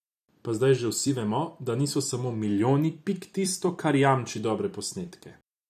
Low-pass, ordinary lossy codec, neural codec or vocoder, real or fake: 14.4 kHz; none; vocoder, 44.1 kHz, 128 mel bands every 256 samples, BigVGAN v2; fake